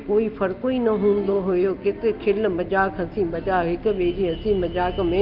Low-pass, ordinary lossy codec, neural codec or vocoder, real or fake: 5.4 kHz; Opus, 32 kbps; none; real